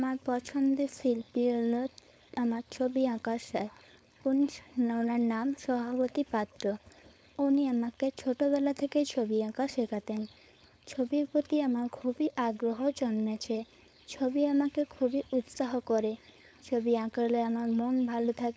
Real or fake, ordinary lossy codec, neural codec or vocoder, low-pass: fake; none; codec, 16 kHz, 4.8 kbps, FACodec; none